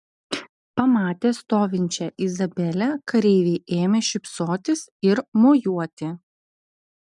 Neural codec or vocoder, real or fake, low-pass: none; real; 10.8 kHz